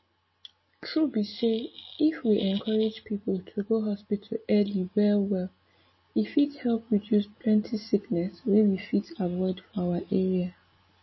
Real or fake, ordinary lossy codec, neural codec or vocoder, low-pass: real; MP3, 24 kbps; none; 7.2 kHz